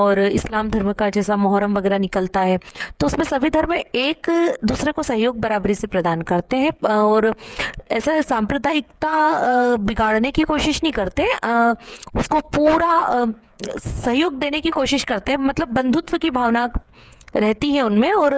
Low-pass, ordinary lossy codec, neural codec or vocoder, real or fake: none; none; codec, 16 kHz, 8 kbps, FreqCodec, smaller model; fake